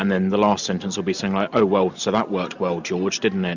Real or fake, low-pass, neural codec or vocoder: real; 7.2 kHz; none